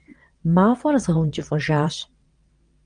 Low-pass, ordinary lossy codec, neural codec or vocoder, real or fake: 9.9 kHz; Opus, 32 kbps; vocoder, 22.05 kHz, 80 mel bands, WaveNeXt; fake